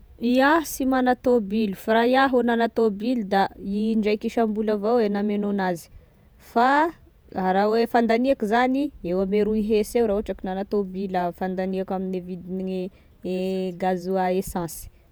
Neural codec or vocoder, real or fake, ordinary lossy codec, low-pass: vocoder, 48 kHz, 128 mel bands, Vocos; fake; none; none